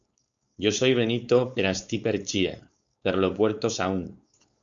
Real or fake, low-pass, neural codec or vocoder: fake; 7.2 kHz; codec, 16 kHz, 4.8 kbps, FACodec